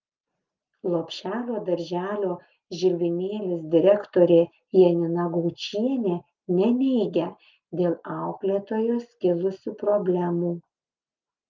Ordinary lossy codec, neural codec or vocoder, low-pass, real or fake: Opus, 24 kbps; none; 7.2 kHz; real